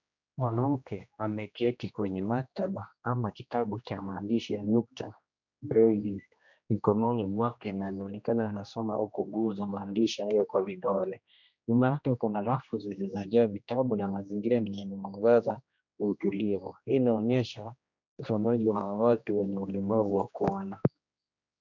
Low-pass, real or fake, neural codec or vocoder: 7.2 kHz; fake; codec, 16 kHz, 1 kbps, X-Codec, HuBERT features, trained on general audio